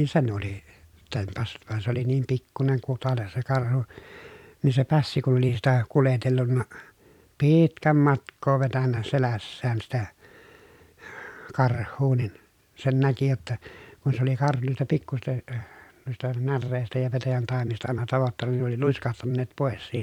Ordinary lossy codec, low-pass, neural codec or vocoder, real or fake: none; 19.8 kHz; vocoder, 44.1 kHz, 128 mel bands every 256 samples, BigVGAN v2; fake